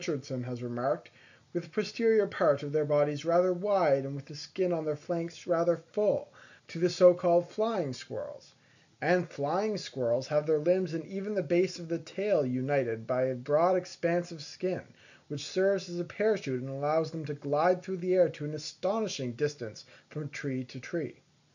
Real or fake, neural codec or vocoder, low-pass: real; none; 7.2 kHz